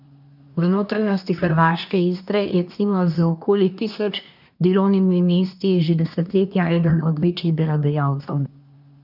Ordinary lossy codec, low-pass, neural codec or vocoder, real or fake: none; 5.4 kHz; codec, 24 kHz, 1 kbps, SNAC; fake